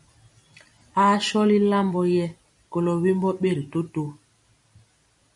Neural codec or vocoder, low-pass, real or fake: none; 10.8 kHz; real